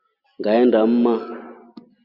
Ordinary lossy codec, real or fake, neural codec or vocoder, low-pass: Opus, 64 kbps; real; none; 5.4 kHz